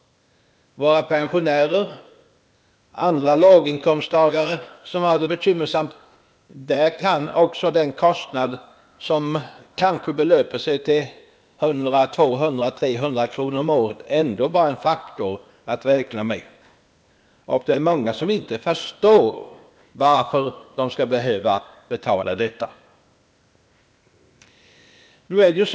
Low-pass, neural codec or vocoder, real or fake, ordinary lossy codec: none; codec, 16 kHz, 0.8 kbps, ZipCodec; fake; none